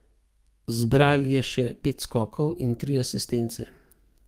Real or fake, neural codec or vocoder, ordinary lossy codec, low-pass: fake; codec, 32 kHz, 1.9 kbps, SNAC; Opus, 32 kbps; 14.4 kHz